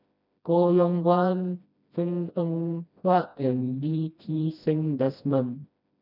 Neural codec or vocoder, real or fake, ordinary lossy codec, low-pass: codec, 16 kHz, 1 kbps, FreqCodec, smaller model; fake; none; 5.4 kHz